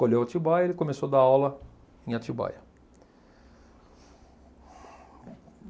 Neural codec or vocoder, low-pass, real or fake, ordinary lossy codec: none; none; real; none